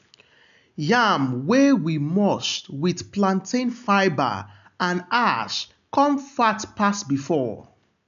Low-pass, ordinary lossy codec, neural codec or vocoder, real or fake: 7.2 kHz; none; none; real